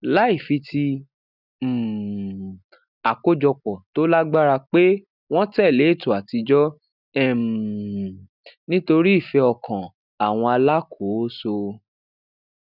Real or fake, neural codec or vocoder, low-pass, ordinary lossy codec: real; none; 5.4 kHz; none